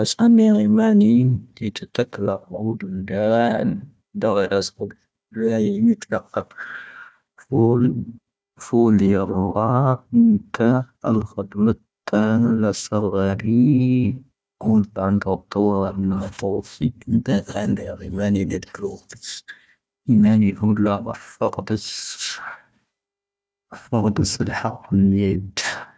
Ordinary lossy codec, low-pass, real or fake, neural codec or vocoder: none; none; fake; codec, 16 kHz, 1 kbps, FunCodec, trained on Chinese and English, 50 frames a second